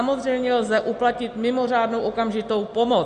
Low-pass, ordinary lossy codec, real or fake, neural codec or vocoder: 9.9 kHz; Opus, 64 kbps; real; none